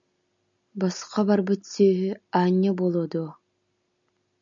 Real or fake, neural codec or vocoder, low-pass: real; none; 7.2 kHz